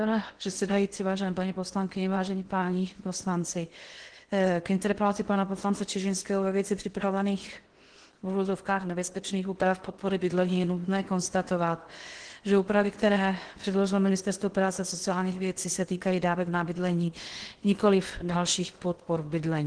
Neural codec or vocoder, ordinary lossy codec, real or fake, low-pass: codec, 16 kHz in and 24 kHz out, 0.8 kbps, FocalCodec, streaming, 65536 codes; Opus, 16 kbps; fake; 9.9 kHz